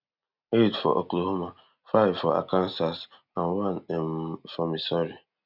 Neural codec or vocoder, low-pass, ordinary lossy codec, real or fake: none; 5.4 kHz; none; real